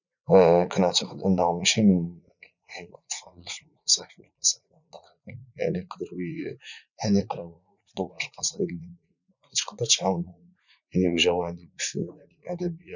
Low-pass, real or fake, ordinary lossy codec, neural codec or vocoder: 7.2 kHz; fake; none; vocoder, 44.1 kHz, 80 mel bands, Vocos